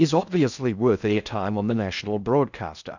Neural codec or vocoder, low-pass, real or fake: codec, 16 kHz in and 24 kHz out, 0.6 kbps, FocalCodec, streaming, 2048 codes; 7.2 kHz; fake